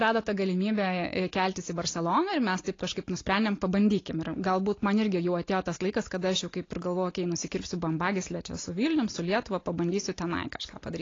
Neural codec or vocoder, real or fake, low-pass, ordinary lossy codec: none; real; 7.2 kHz; AAC, 32 kbps